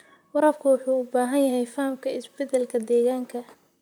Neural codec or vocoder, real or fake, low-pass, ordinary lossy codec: vocoder, 44.1 kHz, 128 mel bands, Pupu-Vocoder; fake; none; none